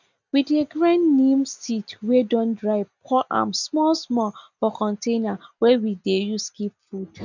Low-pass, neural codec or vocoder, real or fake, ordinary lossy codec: 7.2 kHz; none; real; none